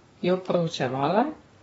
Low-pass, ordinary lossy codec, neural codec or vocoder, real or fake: 14.4 kHz; AAC, 24 kbps; codec, 32 kHz, 1.9 kbps, SNAC; fake